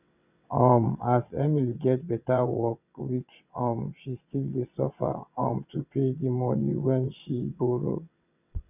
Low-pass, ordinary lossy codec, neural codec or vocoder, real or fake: 3.6 kHz; none; vocoder, 22.05 kHz, 80 mel bands, WaveNeXt; fake